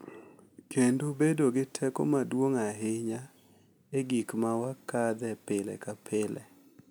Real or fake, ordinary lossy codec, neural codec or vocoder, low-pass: real; none; none; none